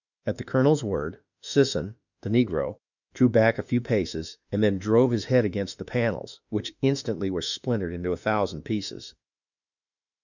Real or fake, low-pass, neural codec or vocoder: fake; 7.2 kHz; autoencoder, 48 kHz, 32 numbers a frame, DAC-VAE, trained on Japanese speech